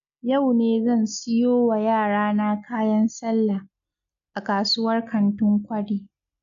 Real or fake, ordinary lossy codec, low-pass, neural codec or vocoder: real; none; 7.2 kHz; none